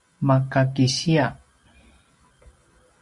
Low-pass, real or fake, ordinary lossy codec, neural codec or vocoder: 10.8 kHz; real; Opus, 64 kbps; none